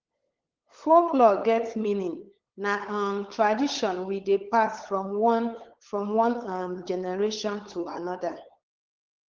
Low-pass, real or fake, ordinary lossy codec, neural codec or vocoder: 7.2 kHz; fake; Opus, 16 kbps; codec, 16 kHz, 8 kbps, FunCodec, trained on LibriTTS, 25 frames a second